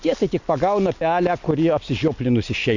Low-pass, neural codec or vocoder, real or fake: 7.2 kHz; none; real